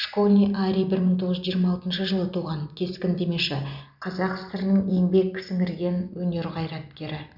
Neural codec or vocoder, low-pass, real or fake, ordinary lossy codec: none; 5.4 kHz; real; none